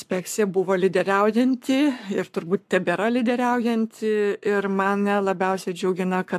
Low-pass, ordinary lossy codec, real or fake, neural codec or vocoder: 14.4 kHz; AAC, 96 kbps; fake; codec, 44.1 kHz, 7.8 kbps, Pupu-Codec